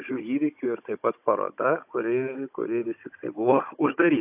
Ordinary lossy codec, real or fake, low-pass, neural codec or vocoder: AAC, 32 kbps; fake; 3.6 kHz; codec, 16 kHz, 16 kbps, FunCodec, trained on Chinese and English, 50 frames a second